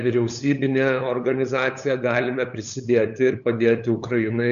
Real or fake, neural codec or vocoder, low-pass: fake; codec, 16 kHz, 8 kbps, FunCodec, trained on LibriTTS, 25 frames a second; 7.2 kHz